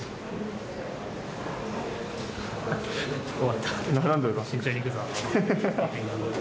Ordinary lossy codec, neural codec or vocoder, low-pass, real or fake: none; none; none; real